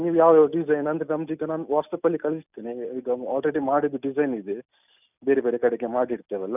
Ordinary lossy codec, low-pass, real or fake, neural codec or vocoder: none; 3.6 kHz; real; none